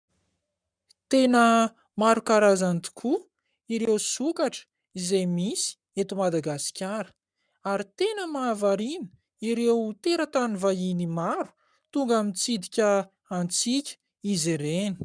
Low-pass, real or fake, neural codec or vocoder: 9.9 kHz; fake; codec, 44.1 kHz, 7.8 kbps, Pupu-Codec